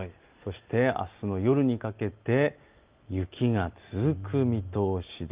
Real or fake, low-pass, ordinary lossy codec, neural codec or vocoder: real; 3.6 kHz; Opus, 24 kbps; none